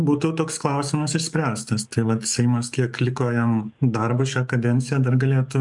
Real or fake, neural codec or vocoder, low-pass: fake; codec, 44.1 kHz, 7.8 kbps, DAC; 10.8 kHz